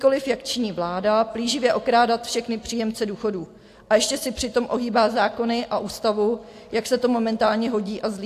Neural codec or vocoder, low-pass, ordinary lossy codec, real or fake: none; 14.4 kHz; AAC, 64 kbps; real